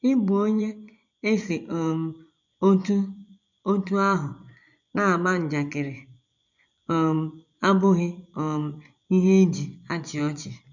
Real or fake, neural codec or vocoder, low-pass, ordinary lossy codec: fake; vocoder, 24 kHz, 100 mel bands, Vocos; 7.2 kHz; none